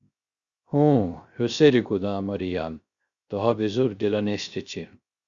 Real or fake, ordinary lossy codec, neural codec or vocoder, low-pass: fake; Opus, 64 kbps; codec, 16 kHz, 0.3 kbps, FocalCodec; 7.2 kHz